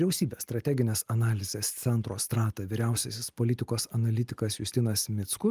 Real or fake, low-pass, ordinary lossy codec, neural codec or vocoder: real; 14.4 kHz; Opus, 32 kbps; none